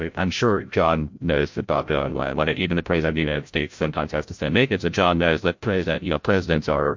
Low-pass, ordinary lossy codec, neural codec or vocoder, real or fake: 7.2 kHz; MP3, 48 kbps; codec, 16 kHz, 0.5 kbps, FreqCodec, larger model; fake